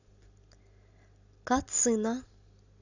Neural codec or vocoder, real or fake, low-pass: none; real; 7.2 kHz